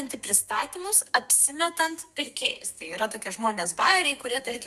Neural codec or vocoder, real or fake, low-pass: codec, 32 kHz, 1.9 kbps, SNAC; fake; 14.4 kHz